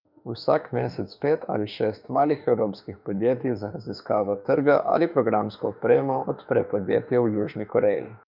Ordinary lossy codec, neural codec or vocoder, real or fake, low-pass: none; autoencoder, 48 kHz, 32 numbers a frame, DAC-VAE, trained on Japanese speech; fake; 5.4 kHz